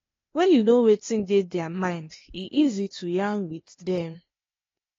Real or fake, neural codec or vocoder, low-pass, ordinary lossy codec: fake; codec, 16 kHz, 0.8 kbps, ZipCodec; 7.2 kHz; AAC, 32 kbps